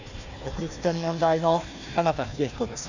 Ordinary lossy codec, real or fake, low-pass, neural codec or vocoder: none; fake; 7.2 kHz; codec, 16 kHz, 1 kbps, FunCodec, trained on Chinese and English, 50 frames a second